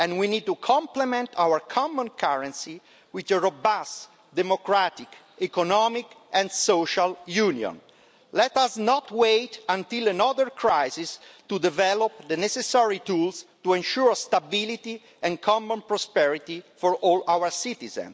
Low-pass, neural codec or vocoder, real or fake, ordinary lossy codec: none; none; real; none